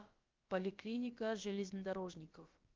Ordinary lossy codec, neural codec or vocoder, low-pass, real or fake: Opus, 32 kbps; codec, 16 kHz, about 1 kbps, DyCAST, with the encoder's durations; 7.2 kHz; fake